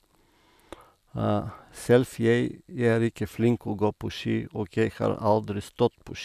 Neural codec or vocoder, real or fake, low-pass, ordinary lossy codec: none; real; 14.4 kHz; none